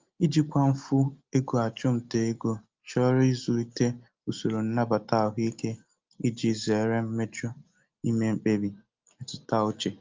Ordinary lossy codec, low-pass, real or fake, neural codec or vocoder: Opus, 24 kbps; 7.2 kHz; real; none